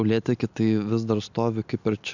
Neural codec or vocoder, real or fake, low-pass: none; real; 7.2 kHz